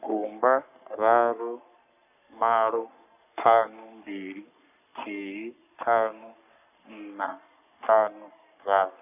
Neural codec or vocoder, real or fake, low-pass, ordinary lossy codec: codec, 44.1 kHz, 3.4 kbps, Pupu-Codec; fake; 3.6 kHz; none